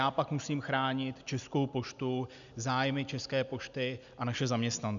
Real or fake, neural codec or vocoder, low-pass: real; none; 7.2 kHz